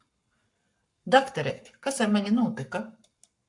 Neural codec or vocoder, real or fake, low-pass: codec, 44.1 kHz, 7.8 kbps, Pupu-Codec; fake; 10.8 kHz